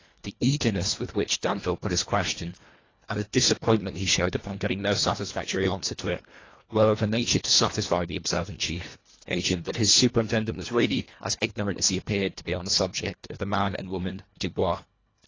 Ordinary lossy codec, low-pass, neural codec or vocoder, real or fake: AAC, 32 kbps; 7.2 kHz; codec, 24 kHz, 1.5 kbps, HILCodec; fake